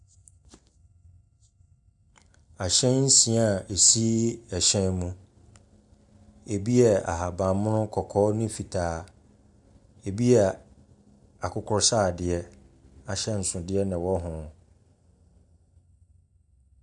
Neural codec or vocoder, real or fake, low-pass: none; real; 10.8 kHz